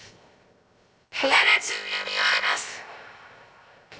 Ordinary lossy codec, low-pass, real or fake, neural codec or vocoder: none; none; fake; codec, 16 kHz, 0.3 kbps, FocalCodec